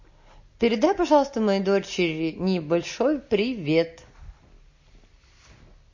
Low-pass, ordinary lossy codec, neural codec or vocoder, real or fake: 7.2 kHz; MP3, 32 kbps; none; real